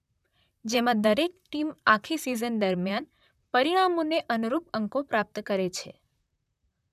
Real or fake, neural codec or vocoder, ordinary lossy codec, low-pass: fake; vocoder, 44.1 kHz, 128 mel bands, Pupu-Vocoder; none; 14.4 kHz